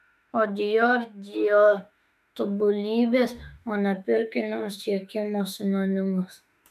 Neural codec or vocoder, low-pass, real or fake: autoencoder, 48 kHz, 32 numbers a frame, DAC-VAE, trained on Japanese speech; 14.4 kHz; fake